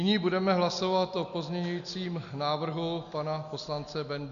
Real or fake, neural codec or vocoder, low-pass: real; none; 7.2 kHz